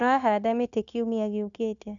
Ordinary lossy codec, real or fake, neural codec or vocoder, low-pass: none; fake; codec, 16 kHz, 0.9 kbps, LongCat-Audio-Codec; 7.2 kHz